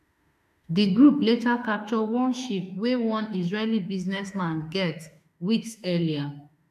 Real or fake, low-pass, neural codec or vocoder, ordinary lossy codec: fake; 14.4 kHz; autoencoder, 48 kHz, 32 numbers a frame, DAC-VAE, trained on Japanese speech; none